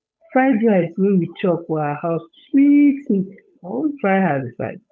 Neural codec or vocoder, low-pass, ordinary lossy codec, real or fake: codec, 16 kHz, 8 kbps, FunCodec, trained on Chinese and English, 25 frames a second; none; none; fake